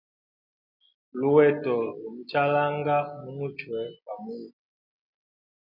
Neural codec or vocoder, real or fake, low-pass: none; real; 5.4 kHz